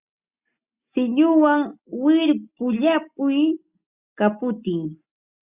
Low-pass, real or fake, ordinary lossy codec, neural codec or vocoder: 3.6 kHz; real; Opus, 64 kbps; none